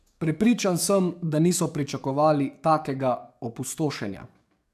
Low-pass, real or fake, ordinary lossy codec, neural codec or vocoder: 14.4 kHz; fake; none; codec, 44.1 kHz, 7.8 kbps, DAC